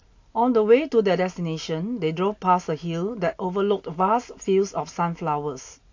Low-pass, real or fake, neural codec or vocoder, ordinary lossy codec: 7.2 kHz; real; none; AAC, 48 kbps